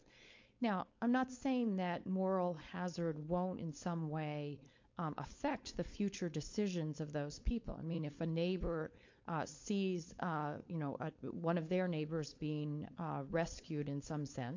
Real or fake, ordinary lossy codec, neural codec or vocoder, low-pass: fake; MP3, 48 kbps; codec, 16 kHz, 4.8 kbps, FACodec; 7.2 kHz